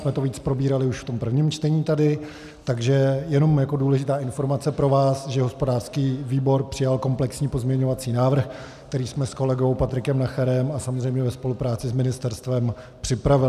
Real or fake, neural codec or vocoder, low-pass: real; none; 14.4 kHz